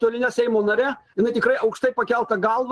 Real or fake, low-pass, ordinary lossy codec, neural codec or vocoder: real; 10.8 kHz; Opus, 16 kbps; none